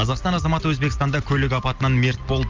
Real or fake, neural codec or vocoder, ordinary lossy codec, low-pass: real; none; Opus, 32 kbps; 7.2 kHz